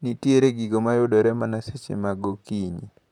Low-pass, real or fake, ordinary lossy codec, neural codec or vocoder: 19.8 kHz; fake; none; vocoder, 44.1 kHz, 128 mel bands, Pupu-Vocoder